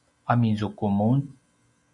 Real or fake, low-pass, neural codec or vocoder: real; 10.8 kHz; none